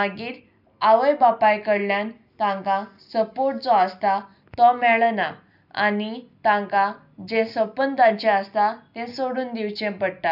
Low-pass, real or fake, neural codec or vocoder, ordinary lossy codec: 5.4 kHz; real; none; none